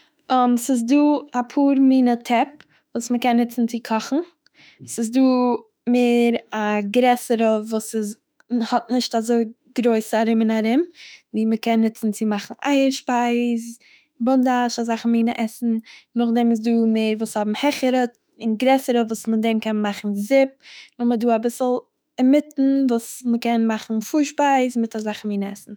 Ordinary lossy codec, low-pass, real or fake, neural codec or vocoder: none; none; fake; autoencoder, 48 kHz, 32 numbers a frame, DAC-VAE, trained on Japanese speech